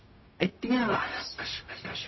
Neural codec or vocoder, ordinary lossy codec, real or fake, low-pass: codec, 16 kHz, 0.4 kbps, LongCat-Audio-Codec; MP3, 24 kbps; fake; 7.2 kHz